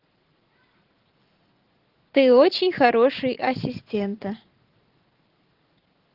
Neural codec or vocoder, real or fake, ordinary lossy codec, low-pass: vocoder, 44.1 kHz, 80 mel bands, Vocos; fake; Opus, 16 kbps; 5.4 kHz